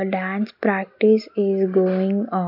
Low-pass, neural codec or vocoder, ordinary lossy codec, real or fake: 5.4 kHz; none; AAC, 48 kbps; real